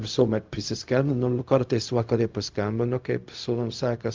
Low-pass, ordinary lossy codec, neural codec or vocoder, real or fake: 7.2 kHz; Opus, 32 kbps; codec, 16 kHz, 0.4 kbps, LongCat-Audio-Codec; fake